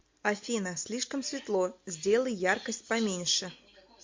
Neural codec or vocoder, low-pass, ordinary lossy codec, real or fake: none; 7.2 kHz; MP3, 48 kbps; real